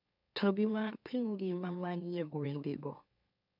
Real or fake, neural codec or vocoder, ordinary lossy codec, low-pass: fake; autoencoder, 44.1 kHz, a latent of 192 numbers a frame, MeloTTS; none; 5.4 kHz